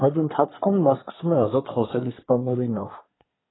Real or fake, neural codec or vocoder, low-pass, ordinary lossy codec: fake; codec, 24 kHz, 1 kbps, SNAC; 7.2 kHz; AAC, 16 kbps